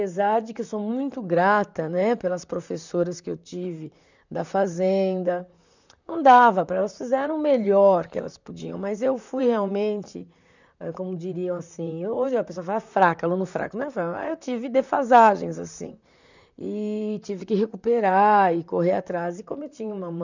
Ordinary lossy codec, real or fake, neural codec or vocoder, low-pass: none; fake; vocoder, 44.1 kHz, 128 mel bands, Pupu-Vocoder; 7.2 kHz